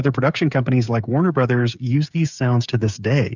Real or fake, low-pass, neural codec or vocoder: fake; 7.2 kHz; codec, 16 kHz, 8 kbps, FreqCodec, smaller model